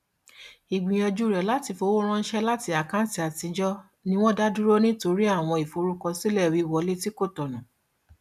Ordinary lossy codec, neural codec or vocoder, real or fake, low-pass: none; none; real; 14.4 kHz